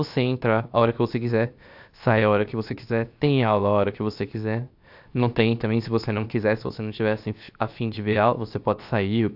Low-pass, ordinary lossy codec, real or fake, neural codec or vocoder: 5.4 kHz; AAC, 48 kbps; fake; codec, 16 kHz, 0.7 kbps, FocalCodec